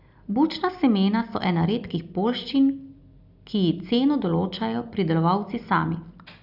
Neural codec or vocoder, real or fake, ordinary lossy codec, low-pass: none; real; Opus, 64 kbps; 5.4 kHz